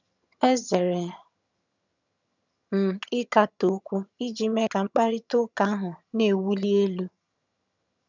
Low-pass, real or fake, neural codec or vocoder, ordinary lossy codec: 7.2 kHz; fake; vocoder, 22.05 kHz, 80 mel bands, HiFi-GAN; none